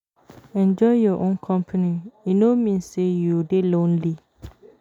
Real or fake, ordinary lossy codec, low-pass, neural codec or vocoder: real; none; 19.8 kHz; none